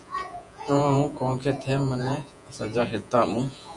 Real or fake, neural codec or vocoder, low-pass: fake; vocoder, 48 kHz, 128 mel bands, Vocos; 10.8 kHz